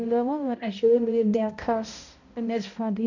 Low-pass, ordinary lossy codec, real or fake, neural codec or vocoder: 7.2 kHz; none; fake; codec, 16 kHz, 0.5 kbps, X-Codec, HuBERT features, trained on balanced general audio